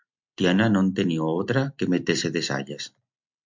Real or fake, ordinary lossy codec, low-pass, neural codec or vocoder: real; MP3, 64 kbps; 7.2 kHz; none